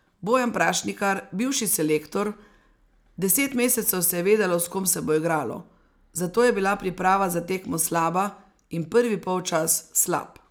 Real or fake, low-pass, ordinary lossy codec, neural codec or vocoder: real; none; none; none